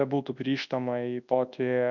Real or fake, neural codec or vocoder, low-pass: fake; codec, 24 kHz, 0.9 kbps, WavTokenizer, large speech release; 7.2 kHz